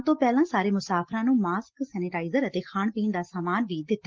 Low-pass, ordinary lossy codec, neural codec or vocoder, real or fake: 7.2 kHz; Opus, 16 kbps; none; real